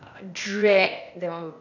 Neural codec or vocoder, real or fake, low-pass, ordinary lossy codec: codec, 16 kHz, 0.8 kbps, ZipCodec; fake; 7.2 kHz; none